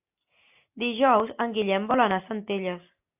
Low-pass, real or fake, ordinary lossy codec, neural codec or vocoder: 3.6 kHz; real; AAC, 32 kbps; none